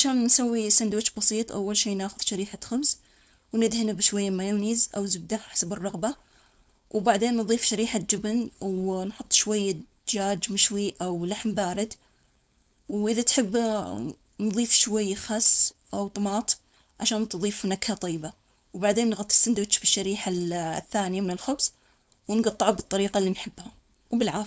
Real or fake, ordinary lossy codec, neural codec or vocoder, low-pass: fake; none; codec, 16 kHz, 4.8 kbps, FACodec; none